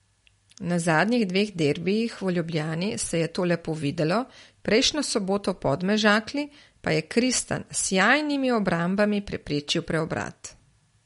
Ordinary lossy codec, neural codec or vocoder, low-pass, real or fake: MP3, 48 kbps; none; 10.8 kHz; real